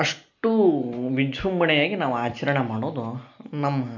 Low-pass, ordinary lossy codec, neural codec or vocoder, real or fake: 7.2 kHz; none; none; real